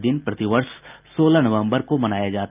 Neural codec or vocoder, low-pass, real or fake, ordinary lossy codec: none; 3.6 kHz; real; Opus, 24 kbps